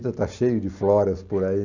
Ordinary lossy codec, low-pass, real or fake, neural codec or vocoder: none; 7.2 kHz; real; none